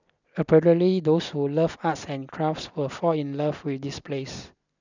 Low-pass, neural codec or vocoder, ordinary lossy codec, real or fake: 7.2 kHz; none; none; real